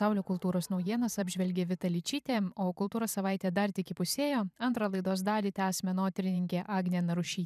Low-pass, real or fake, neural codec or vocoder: 14.4 kHz; fake; vocoder, 44.1 kHz, 128 mel bands every 512 samples, BigVGAN v2